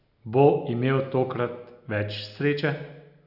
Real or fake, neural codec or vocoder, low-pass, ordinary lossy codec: real; none; 5.4 kHz; none